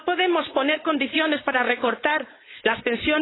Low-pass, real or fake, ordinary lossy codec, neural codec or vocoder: 7.2 kHz; fake; AAC, 16 kbps; codec, 16 kHz, 4.8 kbps, FACodec